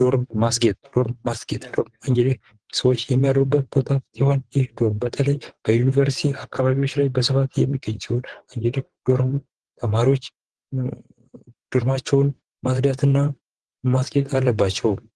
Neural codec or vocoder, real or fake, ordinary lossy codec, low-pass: vocoder, 22.05 kHz, 80 mel bands, WaveNeXt; fake; Opus, 16 kbps; 9.9 kHz